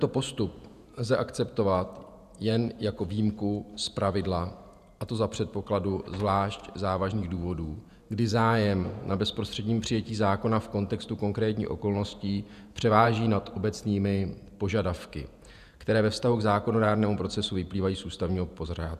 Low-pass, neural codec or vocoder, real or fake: 14.4 kHz; vocoder, 48 kHz, 128 mel bands, Vocos; fake